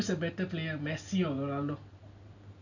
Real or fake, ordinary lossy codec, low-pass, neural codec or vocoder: real; none; 7.2 kHz; none